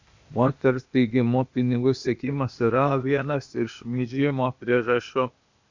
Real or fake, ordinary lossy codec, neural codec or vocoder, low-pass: fake; Opus, 64 kbps; codec, 16 kHz, 0.8 kbps, ZipCodec; 7.2 kHz